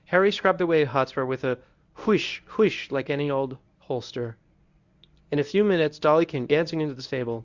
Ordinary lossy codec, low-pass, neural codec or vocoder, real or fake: Opus, 64 kbps; 7.2 kHz; codec, 24 kHz, 0.9 kbps, WavTokenizer, medium speech release version 1; fake